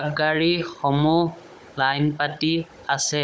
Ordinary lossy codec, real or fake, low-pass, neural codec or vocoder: none; fake; none; codec, 16 kHz, 16 kbps, FunCodec, trained on Chinese and English, 50 frames a second